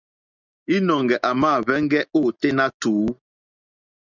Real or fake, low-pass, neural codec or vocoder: real; 7.2 kHz; none